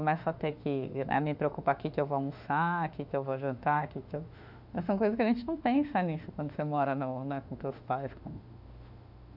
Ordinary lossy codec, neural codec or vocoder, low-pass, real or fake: none; autoencoder, 48 kHz, 32 numbers a frame, DAC-VAE, trained on Japanese speech; 5.4 kHz; fake